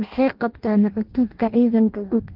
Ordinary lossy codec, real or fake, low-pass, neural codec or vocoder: Opus, 16 kbps; fake; 5.4 kHz; codec, 16 kHz in and 24 kHz out, 0.6 kbps, FireRedTTS-2 codec